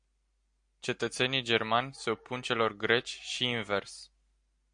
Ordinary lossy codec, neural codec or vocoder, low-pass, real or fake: MP3, 64 kbps; none; 9.9 kHz; real